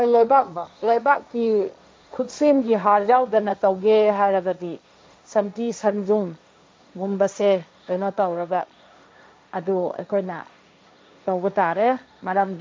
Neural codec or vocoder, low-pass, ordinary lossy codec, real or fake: codec, 16 kHz, 1.1 kbps, Voila-Tokenizer; none; none; fake